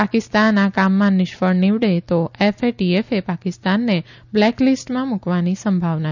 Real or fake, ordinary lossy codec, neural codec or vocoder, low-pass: real; none; none; 7.2 kHz